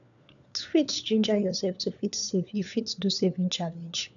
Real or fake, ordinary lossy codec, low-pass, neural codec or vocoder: fake; none; 7.2 kHz; codec, 16 kHz, 4 kbps, FunCodec, trained on LibriTTS, 50 frames a second